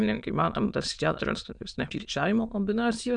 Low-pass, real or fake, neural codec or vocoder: 9.9 kHz; fake; autoencoder, 22.05 kHz, a latent of 192 numbers a frame, VITS, trained on many speakers